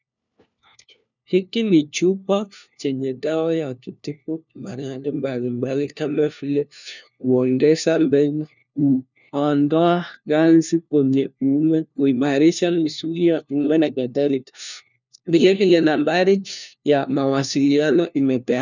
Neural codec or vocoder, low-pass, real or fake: codec, 16 kHz, 1 kbps, FunCodec, trained on LibriTTS, 50 frames a second; 7.2 kHz; fake